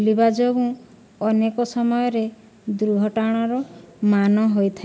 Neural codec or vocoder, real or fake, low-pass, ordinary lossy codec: none; real; none; none